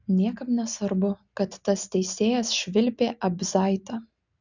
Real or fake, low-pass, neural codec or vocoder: real; 7.2 kHz; none